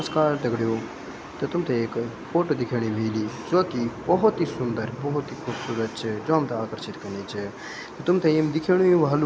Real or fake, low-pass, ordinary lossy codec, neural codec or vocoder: real; none; none; none